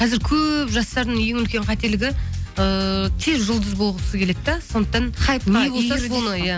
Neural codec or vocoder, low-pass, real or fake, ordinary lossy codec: none; none; real; none